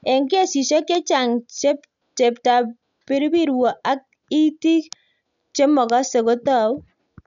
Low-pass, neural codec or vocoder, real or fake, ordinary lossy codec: 7.2 kHz; none; real; none